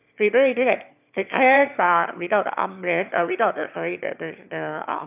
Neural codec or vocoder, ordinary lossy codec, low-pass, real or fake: autoencoder, 22.05 kHz, a latent of 192 numbers a frame, VITS, trained on one speaker; none; 3.6 kHz; fake